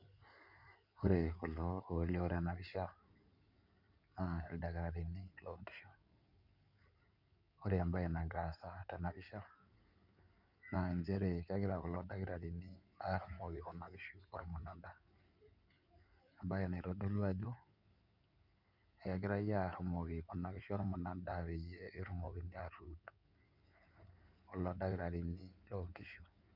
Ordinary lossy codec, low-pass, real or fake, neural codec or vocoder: none; 5.4 kHz; fake; codec, 16 kHz in and 24 kHz out, 2.2 kbps, FireRedTTS-2 codec